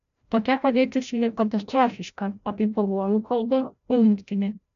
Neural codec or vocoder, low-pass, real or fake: codec, 16 kHz, 0.5 kbps, FreqCodec, larger model; 7.2 kHz; fake